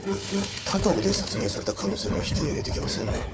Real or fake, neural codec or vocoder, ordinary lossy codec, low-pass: fake; codec, 16 kHz, 4 kbps, FunCodec, trained on Chinese and English, 50 frames a second; none; none